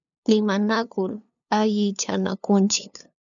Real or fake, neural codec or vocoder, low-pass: fake; codec, 16 kHz, 2 kbps, FunCodec, trained on LibriTTS, 25 frames a second; 7.2 kHz